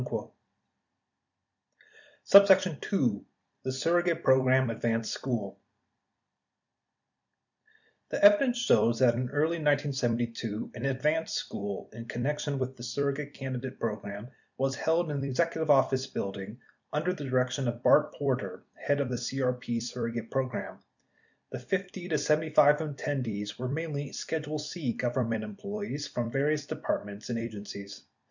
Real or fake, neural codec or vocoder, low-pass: fake; vocoder, 44.1 kHz, 128 mel bands every 256 samples, BigVGAN v2; 7.2 kHz